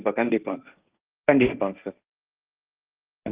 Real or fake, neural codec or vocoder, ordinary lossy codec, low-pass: fake; codec, 16 kHz, 1.1 kbps, Voila-Tokenizer; Opus, 32 kbps; 3.6 kHz